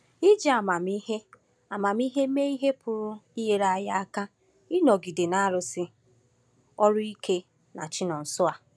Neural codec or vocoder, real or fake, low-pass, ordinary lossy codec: none; real; none; none